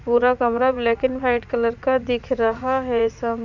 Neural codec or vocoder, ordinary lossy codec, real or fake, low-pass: vocoder, 44.1 kHz, 80 mel bands, Vocos; none; fake; 7.2 kHz